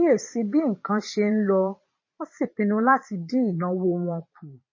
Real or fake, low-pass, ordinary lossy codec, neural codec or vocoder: fake; 7.2 kHz; MP3, 32 kbps; vocoder, 22.05 kHz, 80 mel bands, Vocos